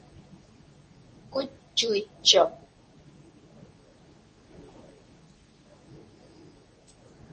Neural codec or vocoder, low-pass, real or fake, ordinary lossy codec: codec, 24 kHz, 0.9 kbps, WavTokenizer, medium speech release version 2; 10.8 kHz; fake; MP3, 32 kbps